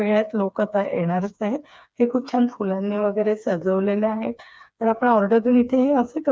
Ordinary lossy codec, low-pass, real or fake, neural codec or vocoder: none; none; fake; codec, 16 kHz, 4 kbps, FreqCodec, smaller model